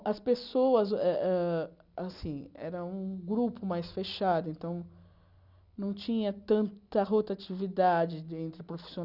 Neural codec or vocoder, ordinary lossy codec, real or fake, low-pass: none; Opus, 64 kbps; real; 5.4 kHz